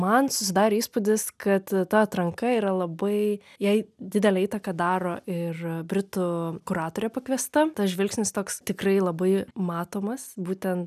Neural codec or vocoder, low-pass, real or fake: none; 14.4 kHz; real